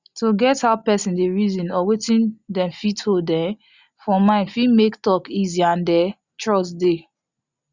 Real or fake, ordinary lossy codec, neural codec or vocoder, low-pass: real; Opus, 64 kbps; none; 7.2 kHz